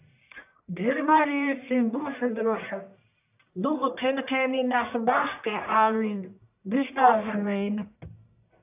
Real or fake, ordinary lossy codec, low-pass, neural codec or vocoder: fake; AAC, 32 kbps; 3.6 kHz; codec, 44.1 kHz, 1.7 kbps, Pupu-Codec